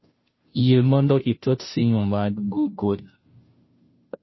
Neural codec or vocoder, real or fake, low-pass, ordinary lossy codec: codec, 16 kHz, 0.5 kbps, FunCodec, trained on Chinese and English, 25 frames a second; fake; 7.2 kHz; MP3, 24 kbps